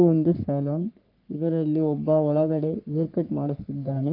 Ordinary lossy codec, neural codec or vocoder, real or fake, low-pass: Opus, 32 kbps; codec, 44.1 kHz, 3.4 kbps, Pupu-Codec; fake; 5.4 kHz